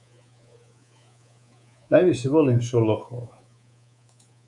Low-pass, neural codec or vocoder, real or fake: 10.8 kHz; codec, 24 kHz, 3.1 kbps, DualCodec; fake